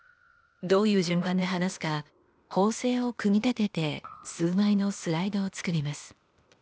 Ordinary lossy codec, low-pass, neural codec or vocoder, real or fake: none; none; codec, 16 kHz, 0.8 kbps, ZipCodec; fake